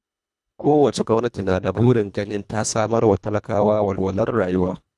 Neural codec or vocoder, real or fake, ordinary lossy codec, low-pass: codec, 24 kHz, 1.5 kbps, HILCodec; fake; none; none